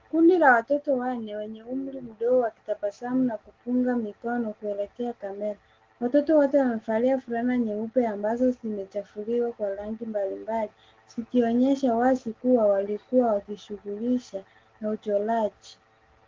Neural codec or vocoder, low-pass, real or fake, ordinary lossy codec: none; 7.2 kHz; real; Opus, 16 kbps